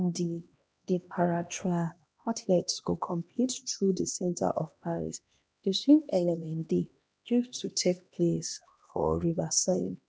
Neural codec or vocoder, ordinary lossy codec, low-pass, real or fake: codec, 16 kHz, 1 kbps, X-Codec, HuBERT features, trained on LibriSpeech; none; none; fake